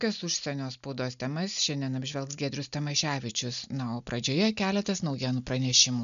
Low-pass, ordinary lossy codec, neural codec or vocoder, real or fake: 7.2 kHz; MP3, 96 kbps; none; real